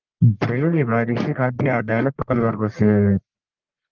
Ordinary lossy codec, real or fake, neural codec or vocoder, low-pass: Opus, 32 kbps; fake; codec, 44.1 kHz, 1.7 kbps, Pupu-Codec; 7.2 kHz